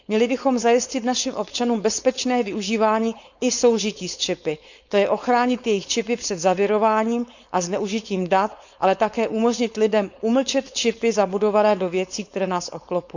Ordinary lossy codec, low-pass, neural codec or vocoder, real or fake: none; 7.2 kHz; codec, 16 kHz, 4.8 kbps, FACodec; fake